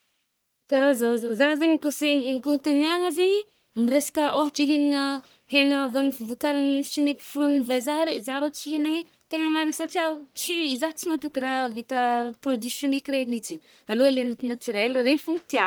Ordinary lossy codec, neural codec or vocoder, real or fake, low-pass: none; codec, 44.1 kHz, 1.7 kbps, Pupu-Codec; fake; none